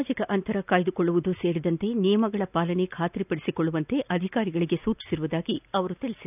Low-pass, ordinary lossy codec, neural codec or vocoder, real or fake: 3.6 kHz; none; none; real